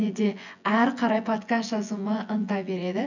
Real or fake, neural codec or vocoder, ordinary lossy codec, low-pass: fake; vocoder, 24 kHz, 100 mel bands, Vocos; none; 7.2 kHz